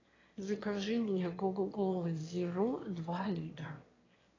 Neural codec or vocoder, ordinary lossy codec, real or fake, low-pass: autoencoder, 22.05 kHz, a latent of 192 numbers a frame, VITS, trained on one speaker; AAC, 32 kbps; fake; 7.2 kHz